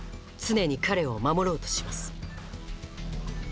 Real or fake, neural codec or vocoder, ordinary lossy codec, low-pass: real; none; none; none